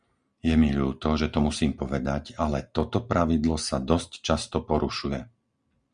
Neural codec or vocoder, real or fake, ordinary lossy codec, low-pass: none; real; Opus, 64 kbps; 9.9 kHz